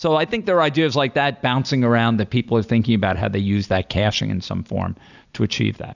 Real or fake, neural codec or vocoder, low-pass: real; none; 7.2 kHz